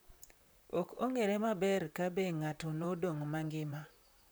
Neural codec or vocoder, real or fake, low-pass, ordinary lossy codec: vocoder, 44.1 kHz, 128 mel bands, Pupu-Vocoder; fake; none; none